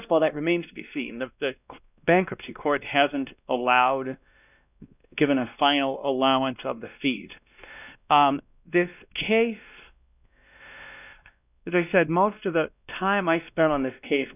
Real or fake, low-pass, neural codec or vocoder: fake; 3.6 kHz; codec, 16 kHz, 1 kbps, X-Codec, WavLM features, trained on Multilingual LibriSpeech